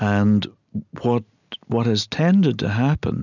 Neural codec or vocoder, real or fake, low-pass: none; real; 7.2 kHz